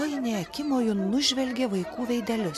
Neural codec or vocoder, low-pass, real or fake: none; 14.4 kHz; real